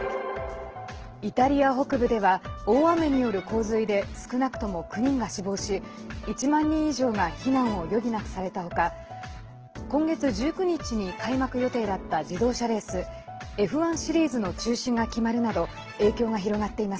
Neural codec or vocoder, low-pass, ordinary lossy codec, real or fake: none; 7.2 kHz; Opus, 16 kbps; real